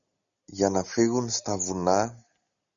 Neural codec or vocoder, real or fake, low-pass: none; real; 7.2 kHz